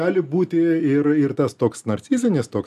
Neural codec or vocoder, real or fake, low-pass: none; real; 14.4 kHz